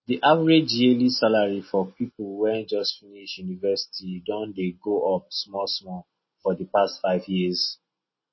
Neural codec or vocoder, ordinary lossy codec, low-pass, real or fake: none; MP3, 24 kbps; 7.2 kHz; real